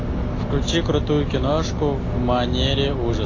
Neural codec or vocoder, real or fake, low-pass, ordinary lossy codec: none; real; 7.2 kHz; AAC, 32 kbps